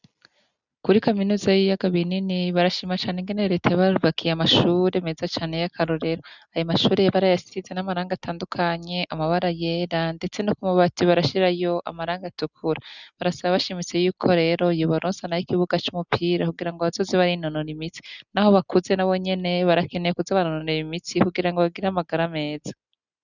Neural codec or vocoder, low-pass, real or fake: none; 7.2 kHz; real